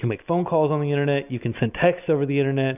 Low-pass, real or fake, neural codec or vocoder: 3.6 kHz; real; none